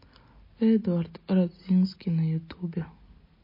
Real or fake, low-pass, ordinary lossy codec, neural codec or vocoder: real; 5.4 kHz; MP3, 24 kbps; none